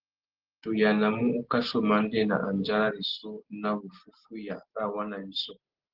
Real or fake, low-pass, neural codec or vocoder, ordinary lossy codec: real; 5.4 kHz; none; Opus, 16 kbps